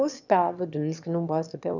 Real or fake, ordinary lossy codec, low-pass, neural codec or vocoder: fake; none; 7.2 kHz; autoencoder, 22.05 kHz, a latent of 192 numbers a frame, VITS, trained on one speaker